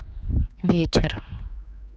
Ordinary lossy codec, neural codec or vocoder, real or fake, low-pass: none; codec, 16 kHz, 2 kbps, X-Codec, HuBERT features, trained on general audio; fake; none